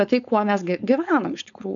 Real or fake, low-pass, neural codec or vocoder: fake; 7.2 kHz; codec, 16 kHz, 4.8 kbps, FACodec